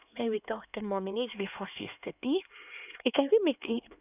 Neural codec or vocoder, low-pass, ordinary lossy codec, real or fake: codec, 16 kHz, 2 kbps, X-Codec, HuBERT features, trained on LibriSpeech; 3.6 kHz; none; fake